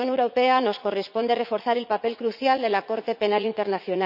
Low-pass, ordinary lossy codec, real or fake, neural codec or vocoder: 5.4 kHz; none; fake; vocoder, 44.1 kHz, 80 mel bands, Vocos